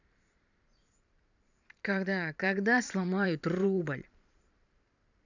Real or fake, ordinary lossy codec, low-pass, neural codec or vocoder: fake; none; 7.2 kHz; vocoder, 44.1 kHz, 128 mel bands every 512 samples, BigVGAN v2